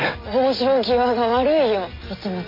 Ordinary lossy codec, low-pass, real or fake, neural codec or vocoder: MP3, 24 kbps; 5.4 kHz; real; none